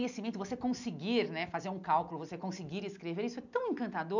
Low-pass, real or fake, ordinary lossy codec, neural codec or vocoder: 7.2 kHz; real; none; none